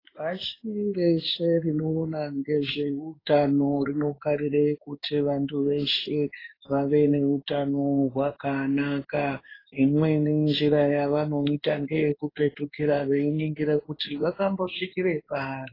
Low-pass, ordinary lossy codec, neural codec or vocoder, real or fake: 5.4 kHz; AAC, 24 kbps; codec, 16 kHz in and 24 kHz out, 1 kbps, XY-Tokenizer; fake